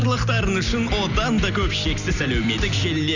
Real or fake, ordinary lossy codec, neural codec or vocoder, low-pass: real; none; none; 7.2 kHz